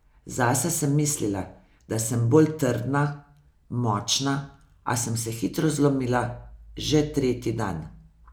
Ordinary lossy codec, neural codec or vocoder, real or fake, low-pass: none; none; real; none